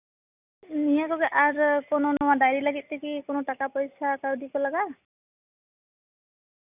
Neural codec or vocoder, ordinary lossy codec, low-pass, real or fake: none; none; 3.6 kHz; real